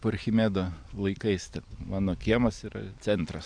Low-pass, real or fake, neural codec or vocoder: 9.9 kHz; real; none